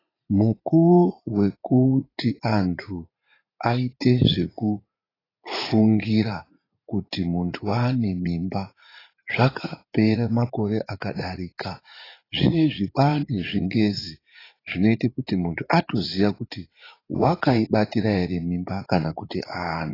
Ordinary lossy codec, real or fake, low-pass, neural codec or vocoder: AAC, 24 kbps; fake; 5.4 kHz; vocoder, 44.1 kHz, 80 mel bands, Vocos